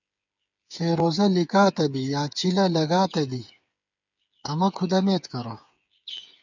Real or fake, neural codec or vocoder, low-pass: fake; codec, 16 kHz, 8 kbps, FreqCodec, smaller model; 7.2 kHz